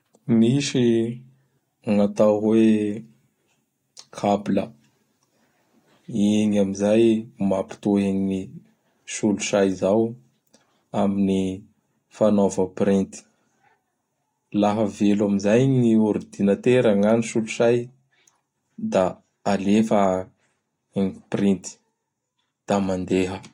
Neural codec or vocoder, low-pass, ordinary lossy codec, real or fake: none; 19.8 kHz; AAC, 48 kbps; real